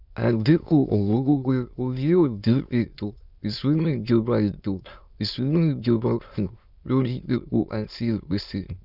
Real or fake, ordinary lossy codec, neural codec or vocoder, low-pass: fake; none; autoencoder, 22.05 kHz, a latent of 192 numbers a frame, VITS, trained on many speakers; 5.4 kHz